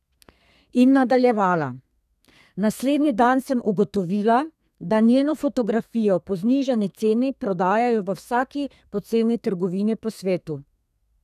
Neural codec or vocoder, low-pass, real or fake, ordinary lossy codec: codec, 32 kHz, 1.9 kbps, SNAC; 14.4 kHz; fake; none